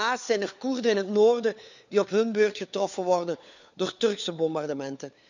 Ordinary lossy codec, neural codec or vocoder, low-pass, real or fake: none; codec, 16 kHz, 4 kbps, FunCodec, trained on LibriTTS, 50 frames a second; 7.2 kHz; fake